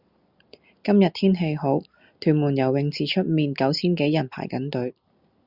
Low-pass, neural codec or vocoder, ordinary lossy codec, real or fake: 5.4 kHz; none; Opus, 64 kbps; real